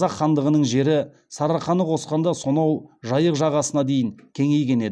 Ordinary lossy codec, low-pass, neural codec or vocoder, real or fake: none; none; none; real